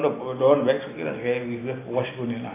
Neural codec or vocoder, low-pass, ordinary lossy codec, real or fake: none; 3.6 kHz; AAC, 16 kbps; real